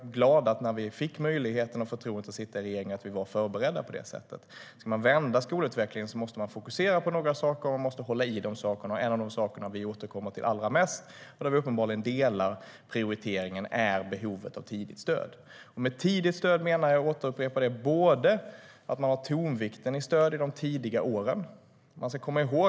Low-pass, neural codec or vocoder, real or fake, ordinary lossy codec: none; none; real; none